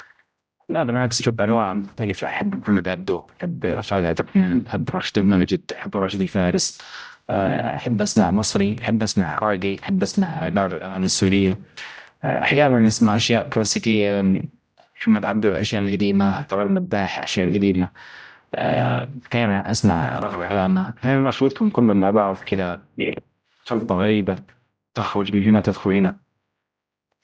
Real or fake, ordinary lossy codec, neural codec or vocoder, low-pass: fake; none; codec, 16 kHz, 0.5 kbps, X-Codec, HuBERT features, trained on general audio; none